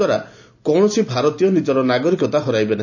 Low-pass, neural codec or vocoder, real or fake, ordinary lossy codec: 7.2 kHz; none; real; none